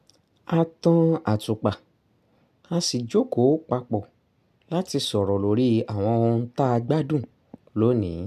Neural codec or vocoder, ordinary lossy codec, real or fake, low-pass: none; MP3, 96 kbps; real; 14.4 kHz